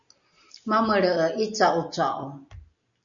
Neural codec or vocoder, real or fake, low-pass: none; real; 7.2 kHz